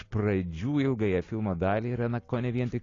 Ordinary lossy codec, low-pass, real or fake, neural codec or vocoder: AAC, 32 kbps; 7.2 kHz; real; none